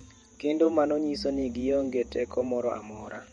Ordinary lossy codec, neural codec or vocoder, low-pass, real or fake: MP3, 48 kbps; vocoder, 48 kHz, 128 mel bands, Vocos; 19.8 kHz; fake